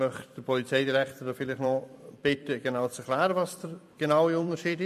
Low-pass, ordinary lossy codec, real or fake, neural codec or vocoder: 14.4 kHz; MP3, 64 kbps; fake; vocoder, 44.1 kHz, 128 mel bands every 256 samples, BigVGAN v2